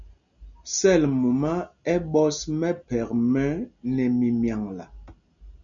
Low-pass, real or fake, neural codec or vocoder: 7.2 kHz; real; none